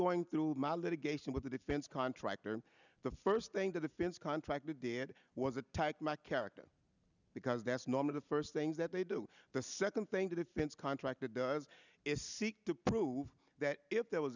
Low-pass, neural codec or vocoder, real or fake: 7.2 kHz; none; real